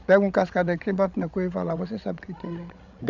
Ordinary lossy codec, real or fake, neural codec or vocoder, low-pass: none; fake; codec, 16 kHz, 16 kbps, FreqCodec, larger model; 7.2 kHz